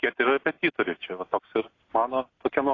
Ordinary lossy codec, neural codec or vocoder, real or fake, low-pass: AAC, 32 kbps; none; real; 7.2 kHz